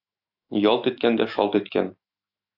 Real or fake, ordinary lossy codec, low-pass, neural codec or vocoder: fake; AAC, 32 kbps; 5.4 kHz; autoencoder, 48 kHz, 128 numbers a frame, DAC-VAE, trained on Japanese speech